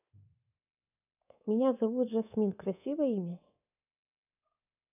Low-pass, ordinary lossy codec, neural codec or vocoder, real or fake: 3.6 kHz; none; codec, 24 kHz, 3.1 kbps, DualCodec; fake